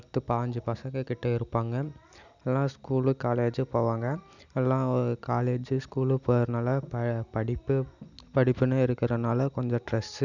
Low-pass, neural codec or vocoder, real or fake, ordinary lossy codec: 7.2 kHz; none; real; none